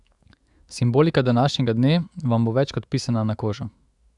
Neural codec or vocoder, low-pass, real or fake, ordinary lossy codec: autoencoder, 48 kHz, 128 numbers a frame, DAC-VAE, trained on Japanese speech; 10.8 kHz; fake; Opus, 64 kbps